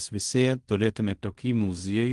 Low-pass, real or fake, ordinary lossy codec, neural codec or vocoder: 10.8 kHz; fake; Opus, 24 kbps; codec, 16 kHz in and 24 kHz out, 0.4 kbps, LongCat-Audio-Codec, fine tuned four codebook decoder